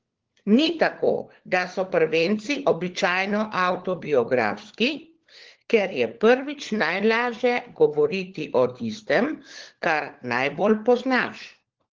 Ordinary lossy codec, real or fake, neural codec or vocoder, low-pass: Opus, 16 kbps; fake; codec, 16 kHz, 4 kbps, FunCodec, trained on LibriTTS, 50 frames a second; 7.2 kHz